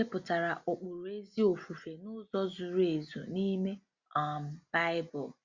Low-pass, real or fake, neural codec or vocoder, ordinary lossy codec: 7.2 kHz; real; none; Opus, 64 kbps